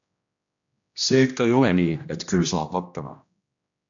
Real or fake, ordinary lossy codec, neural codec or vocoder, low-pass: fake; AAC, 64 kbps; codec, 16 kHz, 1 kbps, X-Codec, HuBERT features, trained on general audio; 7.2 kHz